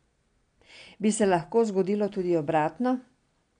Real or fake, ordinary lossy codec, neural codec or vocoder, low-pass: real; none; none; 9.9 kHz